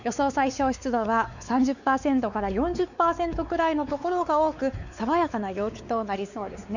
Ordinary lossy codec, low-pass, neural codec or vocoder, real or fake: none; 7.2 kHz; codec, 16 kHz, 4 kbps, X-Codec, WavLM features, trained on Multilingual LibriSpeech; fake